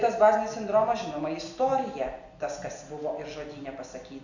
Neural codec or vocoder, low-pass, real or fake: none; 7.2 kHz; real